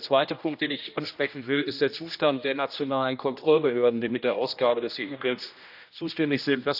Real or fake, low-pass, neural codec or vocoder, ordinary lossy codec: fake; 5.4 kHz; codec, 16 kHz, 1 kbps, X-Codec, HuBERT features, trained on general audio; none